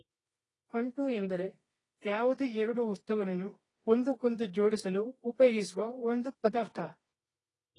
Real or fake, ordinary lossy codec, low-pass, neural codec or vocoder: fake; AAC, 32 kbps; 10.8 kHz; codec, 24 kHz, 0.9 kbps, WavTokenizer, medium music audio release